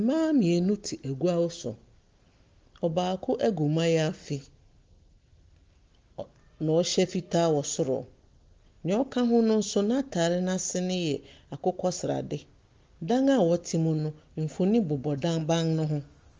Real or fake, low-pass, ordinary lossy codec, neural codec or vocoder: real; 7.2 kHz; Opus, 32 kbps; none